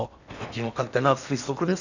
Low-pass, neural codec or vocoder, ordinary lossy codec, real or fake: 7.2 kHz; codec, 16 kHz in and 24 kHz out, 0.8 kbps, FocalCodec, streaming, 65536 codes; none; fake